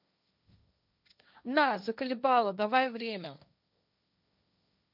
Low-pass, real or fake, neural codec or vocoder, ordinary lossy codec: 5.4 kHz; fake; codec, 16 kHz, 1.1 kbps, Voila-Tokenizer; none